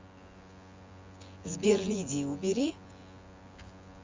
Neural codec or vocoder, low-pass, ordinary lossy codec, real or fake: vocoder, 24 kHz, 100 mel bands, Vocos; 7.2 kHz; Opus, 32 kbps; fake